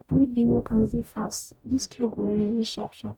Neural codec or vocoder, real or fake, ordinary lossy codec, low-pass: codec, 44.1 kHz, 0.9 kbps, DAC; fake; none; 19.8 kHz